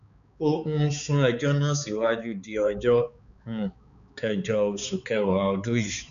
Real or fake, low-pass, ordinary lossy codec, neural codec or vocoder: fake; 7.2 kHz; none; codec, 16 kHz, 4 kbps, X-Codec, HuBERT features, trained on balanced general audio